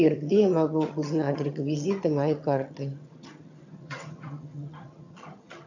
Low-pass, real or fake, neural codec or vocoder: 7.2 kHz; fake; vocoder, 22.05 kHz, 80 mel bands, HiFi-GAN